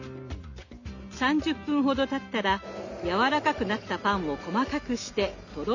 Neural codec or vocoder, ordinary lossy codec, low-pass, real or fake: none; none; 7.2 kHz; real